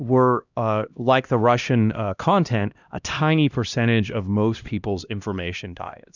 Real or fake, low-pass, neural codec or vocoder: fake; 7.2 kHz; codec, 16 kHz, 1 kbps, X-Codec, HuBERT features, trained on LibriSpeech